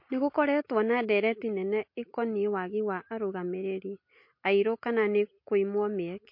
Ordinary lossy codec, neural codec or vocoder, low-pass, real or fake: MP3, 32 kbps; none; 5.4 kHz; real